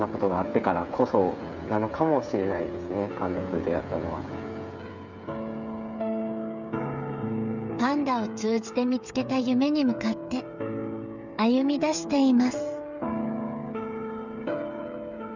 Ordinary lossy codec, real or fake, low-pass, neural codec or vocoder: none; fake; 7.2 kHz; codec, 16 kHz, 8 kbps, FreqCodec, smaller model